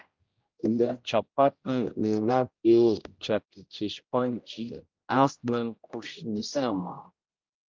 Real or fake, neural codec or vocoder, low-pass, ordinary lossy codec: fake; codec, 16 kHz, 0.5 kbps, X-Codec, HuBERT features, trained on general audio; 7.2 kHz; Opus, 24 kbps